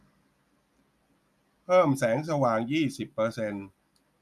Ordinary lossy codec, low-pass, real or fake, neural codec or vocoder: none; 14.4 kHz; real; none